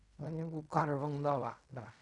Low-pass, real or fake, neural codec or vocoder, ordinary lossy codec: 10.8 kHz; fake; codec, 16 kHz in and 24 kHz out, 0.4 kbps, LongCat-Audio-Codec, fine tuned four codebook decoder; none